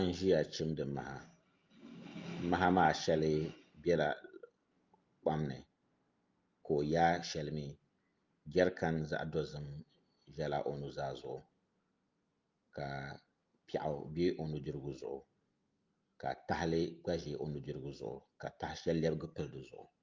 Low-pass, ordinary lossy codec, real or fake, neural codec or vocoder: 7.2 kHz; Opus, 24 kbps; real; none